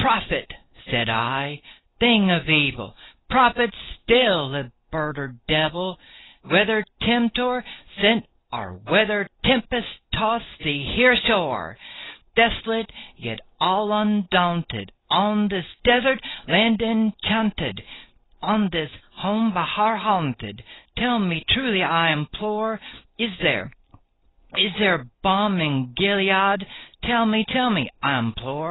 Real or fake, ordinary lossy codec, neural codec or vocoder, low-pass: real; AAC, 16 kbps; none; 7.2 kHz